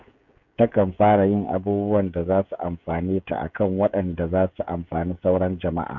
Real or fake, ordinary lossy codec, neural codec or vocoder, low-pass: fake; none; codec, 16 kHz, 16 kbps, FreqCodec, smaller model; 7.2 kHz